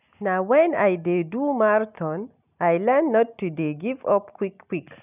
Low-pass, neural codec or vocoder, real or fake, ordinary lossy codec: 3.6 kHz; none; real; none